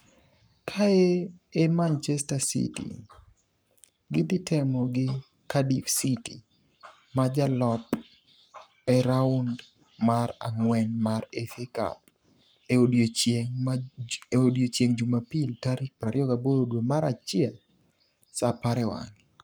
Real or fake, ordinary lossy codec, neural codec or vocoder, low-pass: fake; none; codec, 44.1 kHz, 7.8 kbps, Pupu-Codec; none